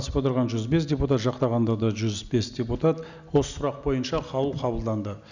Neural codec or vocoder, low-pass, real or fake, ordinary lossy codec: none; 7.2 kHz; real; none